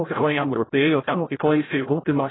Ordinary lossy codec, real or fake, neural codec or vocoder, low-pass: AAC, 16 kbps; fake; codec, 16 kHz, 0.5 kbps, FreqCodec, larger model; 7.2 kHz